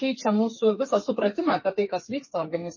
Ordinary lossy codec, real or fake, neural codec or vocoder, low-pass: MP3, 32 kbps; fake; codec, 32 kHz, 1.9 kbps, SNAC; 7.2 kHz